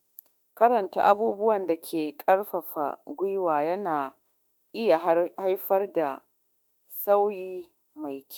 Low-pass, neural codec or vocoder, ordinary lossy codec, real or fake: none; autoencoder, 48 kHz, 32 numbers a frame, DAC-VAE, trained on Japanese speech; none; fake